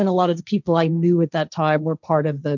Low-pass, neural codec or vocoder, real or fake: 7.2 kHz; codec, 16 kHz, 1.1 kbps, Voila-Tokenizer; fake